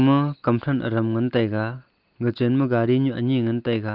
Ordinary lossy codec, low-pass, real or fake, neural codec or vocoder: Opus, 24 kbps; 5.4 kHz; real; none